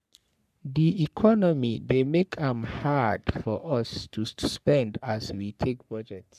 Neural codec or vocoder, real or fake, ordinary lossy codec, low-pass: codec, 44.1 kHz, 3.4 kbps, Pupu-Codec; fake; none; 14.4 kHz